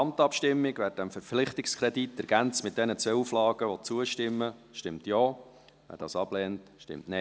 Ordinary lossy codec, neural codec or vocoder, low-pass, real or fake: none; none; none; real